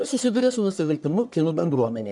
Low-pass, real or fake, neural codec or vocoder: 10.8 kHz; fake; codec, 44.1 kHz, 1.7 kbps, Pupu-Codec